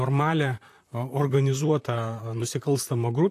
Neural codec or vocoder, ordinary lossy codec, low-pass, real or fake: vocoder, 44.1 kHz, 128 mel bands, Pupu-Vocoder; AAC, 64 kbps; 14.4 kHz; fake